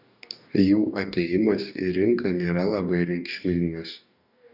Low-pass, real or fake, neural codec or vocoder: 5.4 kHz; fake; codec, 44.1 kHz, 2.6 kbps, DAC